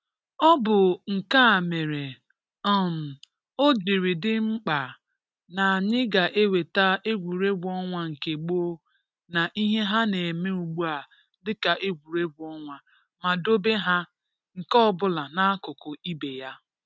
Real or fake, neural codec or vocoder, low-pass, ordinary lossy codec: real; none; none; none